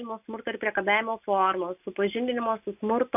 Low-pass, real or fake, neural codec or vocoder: 3.6 kHz; real; none